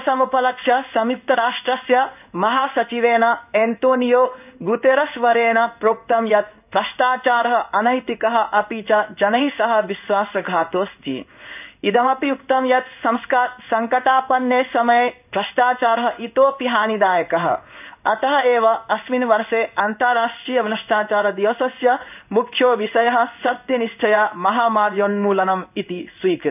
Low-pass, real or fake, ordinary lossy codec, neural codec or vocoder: 3.6 kHz; fake; none; codec, 16 kHz in and 24 kHz out, 1 kbps, XY-Tokenizer